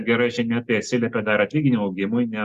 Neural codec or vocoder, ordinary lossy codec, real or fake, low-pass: none; Opus, 64 kbps; real; 14.4 kHz